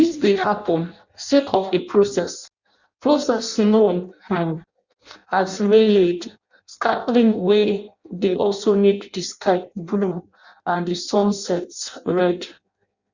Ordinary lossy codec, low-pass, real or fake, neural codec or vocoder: Opus, 64 kbps; 7.2 kHz; fake; codec, 16 kHz in and 24 kHz out, 0.6 kbps, FireRedTTS-2 codec